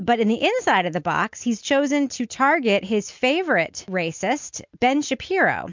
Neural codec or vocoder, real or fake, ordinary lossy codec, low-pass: none; real; MP3, 64 kbps; 7.2 kHz